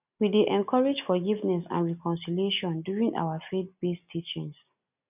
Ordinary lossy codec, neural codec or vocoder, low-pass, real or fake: none; none; 3.6 kHz; real